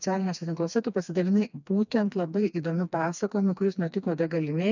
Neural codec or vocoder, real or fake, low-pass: codec, 16 kHz, 2 kbps, FreqCodec, smaller model; fake; 7.2 kHz